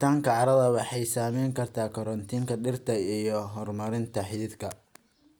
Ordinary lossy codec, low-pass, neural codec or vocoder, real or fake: none; none; none; real